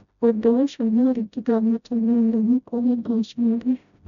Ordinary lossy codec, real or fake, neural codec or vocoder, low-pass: none; fake; codec, 16 kHz, 0.5 kbps, FreqCodec, smaller model; 7.2 kHz